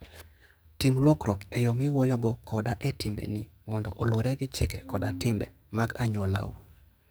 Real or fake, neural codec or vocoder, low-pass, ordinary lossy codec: fake; codec, 44.1 kHz, 2.6 kbps, SNAC; none; none